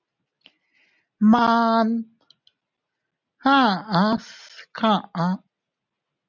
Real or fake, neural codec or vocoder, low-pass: real; none; 7.2 kHz